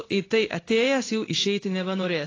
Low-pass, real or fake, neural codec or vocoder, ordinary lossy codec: 7.2 kHz; fake; codec, 16 kHz in and 24 kHz out, 1 kbps, XY-Tokenizer; AAC, 32 kbps